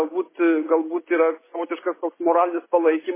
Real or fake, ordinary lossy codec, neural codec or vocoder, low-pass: real; MP3, 16 kbps; none; 3.6 kHz